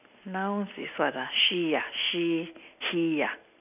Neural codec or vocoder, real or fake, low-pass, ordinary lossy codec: none; real; 3.6 kHz; none